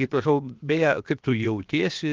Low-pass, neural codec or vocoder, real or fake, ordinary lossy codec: 7.2 kHz; codec, 16 kHz, 0.7 kbps, FocalCodec; fake; Opus, 32 kbps